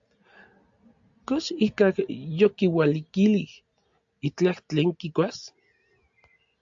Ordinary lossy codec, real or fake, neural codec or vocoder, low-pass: AAC, 64 kbps; real; none; 7.2 kHz